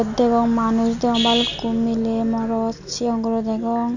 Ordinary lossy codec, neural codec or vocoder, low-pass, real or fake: none; none; 7.2 kHz; real